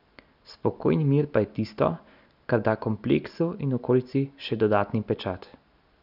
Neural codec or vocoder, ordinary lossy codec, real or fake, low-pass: none; none; real; 5.4 kHz